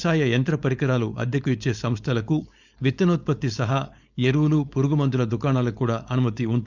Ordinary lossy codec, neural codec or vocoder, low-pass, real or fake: none; codec, 16 kHz, 4.8 kbps, FACodec; 7.2 kHz; fake